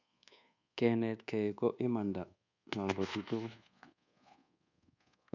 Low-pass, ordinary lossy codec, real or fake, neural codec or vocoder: 7.2 kHz; none; fake; codec, 24 kHz, 1.2 kbps, DualCodec